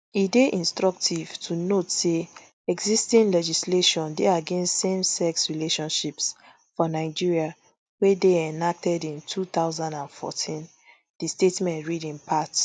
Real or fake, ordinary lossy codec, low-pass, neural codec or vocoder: real; none; none; none